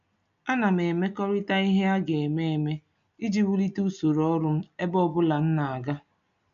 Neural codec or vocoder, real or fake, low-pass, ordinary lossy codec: none; real; 7.2 kHz; MP3, 96 kbps